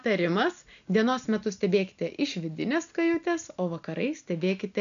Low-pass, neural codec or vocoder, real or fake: 7.2 kHz; none; real